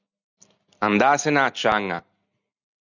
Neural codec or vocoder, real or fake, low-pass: none; real; 7.2 kHz